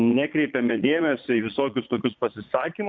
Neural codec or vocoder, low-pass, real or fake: vocoder, 22.05 kHz, 80 mel bands, Vocos; 7.2 kHz; fake